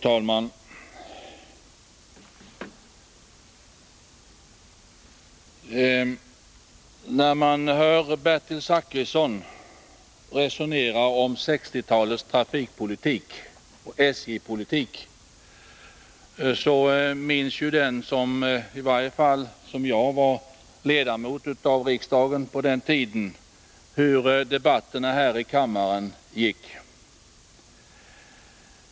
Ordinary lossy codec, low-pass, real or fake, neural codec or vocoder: none; none; real; none